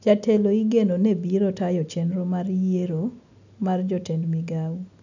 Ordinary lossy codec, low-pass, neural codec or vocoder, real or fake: none; 7.2 kHz; none; real